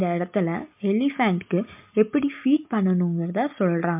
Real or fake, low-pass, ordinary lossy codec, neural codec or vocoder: real; 3.6 kHz; MP3, 32 kbps; none